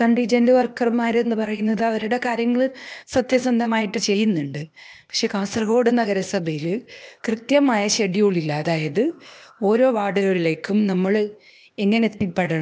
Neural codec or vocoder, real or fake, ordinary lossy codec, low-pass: codec, 16 kHz, 0.8 kbps, ZipCodec; fake; none; none